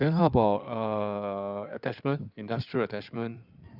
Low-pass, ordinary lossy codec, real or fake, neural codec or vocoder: 5.4 kHz; none; fake; codec, 16 kHz in and 24 kHz out, 2.2 kbps, FireRedTTS-2 codec